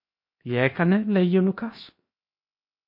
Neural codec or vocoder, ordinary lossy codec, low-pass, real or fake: codec, 16 kHz, 0.7 kbps, FocalCodec; MP3, 32 kbps; 5.4 kHz; fake